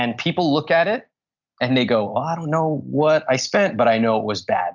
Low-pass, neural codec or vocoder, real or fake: 7.2 kHz; none; real